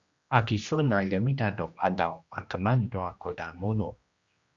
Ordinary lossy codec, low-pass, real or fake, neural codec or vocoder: Opus, 64 kbps; 7.2 kHz; fake; codec, 16 kHz, 1 kbps, X-Codec, HuBERT features, trained on general audio